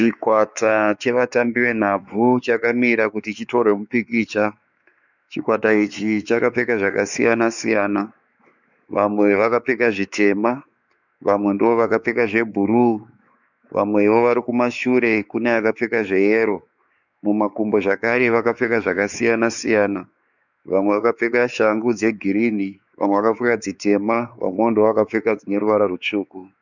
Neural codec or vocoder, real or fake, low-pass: codec, 16 kHz, 4 kbps, X-Codec, WavLM features, trained on Multilingual LibriSpeech; fake; 7.2 kHz